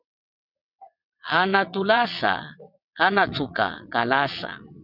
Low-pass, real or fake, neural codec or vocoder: 5.4 kHz; fake; vocoder, 22.05 kHz, 80 mel bands, WaveNeXt